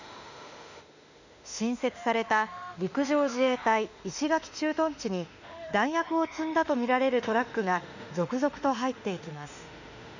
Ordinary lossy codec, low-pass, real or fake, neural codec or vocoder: none; 7.2 kHz; fake; autoencoder, 48 kHz, 32 numbers a frame, DAC-VAE, trained on Japanese speech